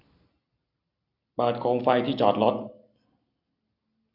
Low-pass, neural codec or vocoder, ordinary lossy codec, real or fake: 5.4 kHz; none; none; real